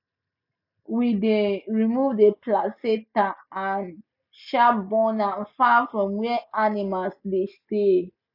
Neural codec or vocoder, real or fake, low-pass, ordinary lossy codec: none; real; 5.4 kHz; none